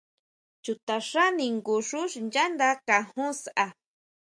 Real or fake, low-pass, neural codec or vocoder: real; 9.9 kHz; none